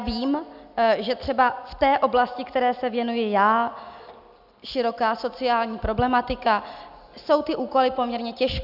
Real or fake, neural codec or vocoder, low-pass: real; none; 5.4 kHz